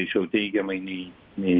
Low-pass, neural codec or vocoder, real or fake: 5.4 kHz; none; real